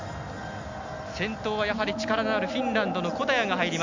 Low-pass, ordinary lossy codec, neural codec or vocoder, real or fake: 7.2 kHz; MP3, 64 kbps; none; real